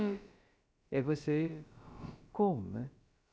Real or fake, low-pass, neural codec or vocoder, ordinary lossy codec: fake; none; codec, 16 kHz, about 1 kbps, DyCAST, with the encoder's durations; none